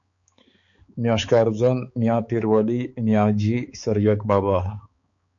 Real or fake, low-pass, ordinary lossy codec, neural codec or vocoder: fake; 7.2 kHz; MP3, 48 kbps; codec, 16 kHz, 4 kbps, X-Codec, HuBERT features, trained on balanced general audio